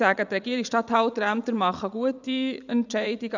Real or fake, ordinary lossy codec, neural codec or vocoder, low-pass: fake; none; vocoder, 44.1 kHz, 80 mel bands, Vocos; 7.2 kHz